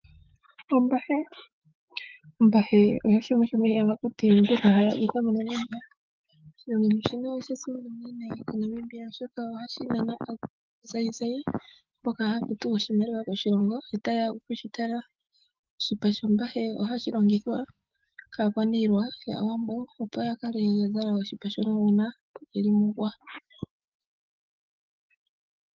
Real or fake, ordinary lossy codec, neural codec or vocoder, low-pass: fake; Opus, 32 kbps; autoencoder, 48 kHz, 128 numbers a frame, DAC-VAE, trained on Japanese speech; 7.2 kHz